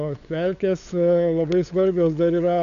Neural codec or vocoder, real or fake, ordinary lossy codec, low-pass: codec, 16 kHz, 8 kbps, FunCodec, trained on LibriTTS, 25 frames a second; fake; MP3, 96 kbps; 7.2 kHz